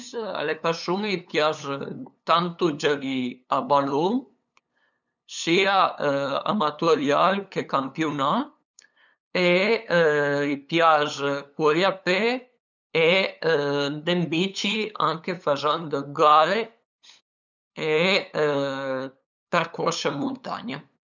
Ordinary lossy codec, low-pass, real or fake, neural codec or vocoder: none; 7.2 kHz; fake; codec, 16 kHz, 8 kbps, FunCodec, trained on LibriTTS, 25 frames a second